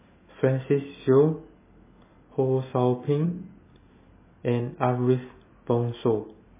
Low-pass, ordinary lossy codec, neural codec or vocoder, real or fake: 3.6 kHz; MP3, 16 kbps; none; real